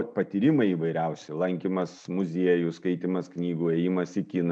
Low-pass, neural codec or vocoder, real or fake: 9.9 kHz; none; real